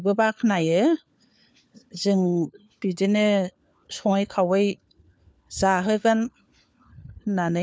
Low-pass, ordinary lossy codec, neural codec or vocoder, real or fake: none; none; codec, 16 kHz, 4 kbps, FunCodec, trained on LibriTTS, 50 frames a second; fake